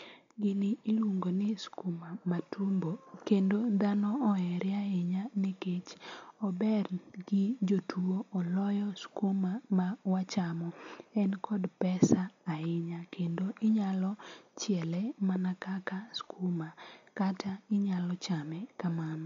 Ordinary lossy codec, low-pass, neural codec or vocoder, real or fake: MP3, 48 kbps; 7.2 kHz; none; real